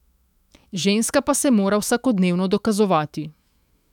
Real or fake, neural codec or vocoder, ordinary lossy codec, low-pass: fake; autoencoder, 48 kHz, 128 numbers a frame, DAC-VAE, trained on Japanese speech; none; 19.8 kHz